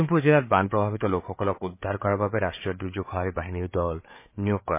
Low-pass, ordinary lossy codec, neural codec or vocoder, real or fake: 3.6 kHz; MP3, 24 kbps; codec, 16 kHz, 8 kbps, FreqCodec, larger model; fake